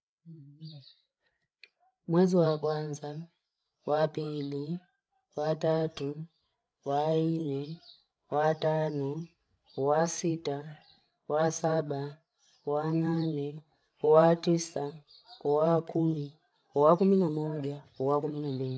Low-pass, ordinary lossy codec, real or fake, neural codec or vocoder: none; none; fake; codec, 16 kHz, 16 kbps, FreqCodec, larger model